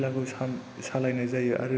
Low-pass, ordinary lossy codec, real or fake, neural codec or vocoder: none; none; real; none